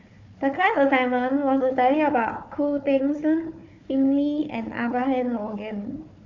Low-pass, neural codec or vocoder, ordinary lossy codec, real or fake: 7.2 kHz; codec, 16 kHz, 4 kbps, FunCodec, trained on Chinese and English, 50 frames a second; AAC, 48 kbps; fake